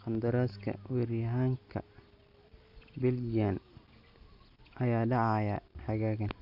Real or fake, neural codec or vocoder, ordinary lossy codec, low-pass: real; none; none; 5.4 kHz